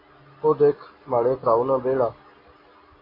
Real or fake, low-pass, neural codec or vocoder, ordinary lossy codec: real; 5.4 kHz; none; AAC, 24 kbps